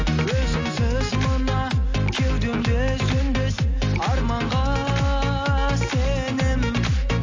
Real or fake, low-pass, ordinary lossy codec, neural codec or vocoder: real; 7.2 kHz; AAC, 48 kbps; none